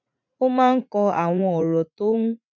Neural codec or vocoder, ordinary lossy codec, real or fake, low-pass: vocoder, 44.1 kHz, 80 mel bands, Vocos; none; fake; 7.2 kHz